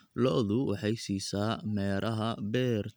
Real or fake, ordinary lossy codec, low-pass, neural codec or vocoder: real; none; none; none